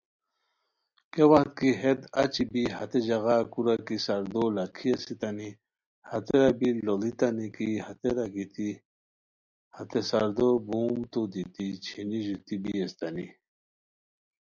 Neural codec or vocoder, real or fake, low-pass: none; real; 7.2 kHz